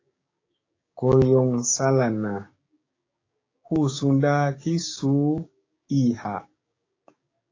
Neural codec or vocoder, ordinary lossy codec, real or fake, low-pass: codec, 16 kHz, 6 kbps, DAC; AAC, 32 kbps; fake; 7.2 kHz